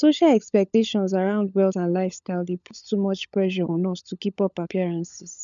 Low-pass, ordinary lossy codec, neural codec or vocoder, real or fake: 7.2 kHz; none; codec, 16 kHz, 16 kbps, FunCodec, trained on LibriTTS, 50 frames a second; fake